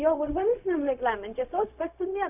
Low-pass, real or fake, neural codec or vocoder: 3.6 kHz; fake; codec, 16 kHz, 0.4 kbps, LongCat-Audio-Codec